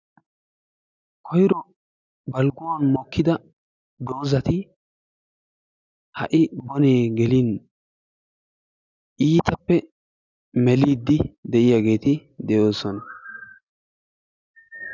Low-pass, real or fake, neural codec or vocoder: 7.2 kHz; real; none